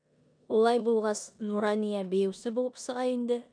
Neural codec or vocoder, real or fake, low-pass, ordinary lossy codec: codec, 16 kHz in and 24 kHz out, 0.9 kbps, LongCat-Audio-Codec, four codebook decoder; fake; 9.9 kHz; MP3, 96 kbps